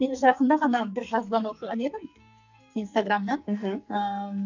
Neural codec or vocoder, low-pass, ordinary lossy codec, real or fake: codec, 44.1 kHz, 2.6 kbps, SNAC; 7.2 kHz; none; fake